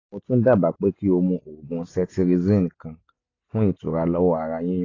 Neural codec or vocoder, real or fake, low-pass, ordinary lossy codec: none; real; 7.2 kHz; AAC, 32 kbps